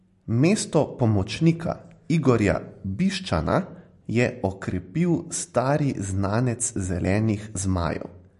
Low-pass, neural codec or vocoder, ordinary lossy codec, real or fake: 14.4 kHz; none; MP3, 48 kbps; real